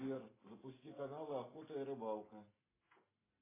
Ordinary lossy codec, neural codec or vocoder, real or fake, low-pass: AAC, 16 kbps; none; real; 3.6 kHz